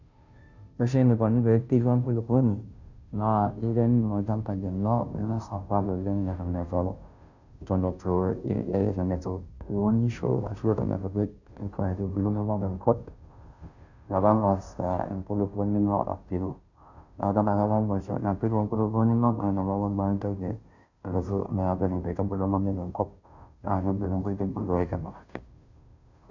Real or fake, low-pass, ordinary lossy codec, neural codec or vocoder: fake; 7.2 kHz; none; codec, 16 kHz, 0.5 kbps, FunCodec, trained on Chinese and English, 25 frames a second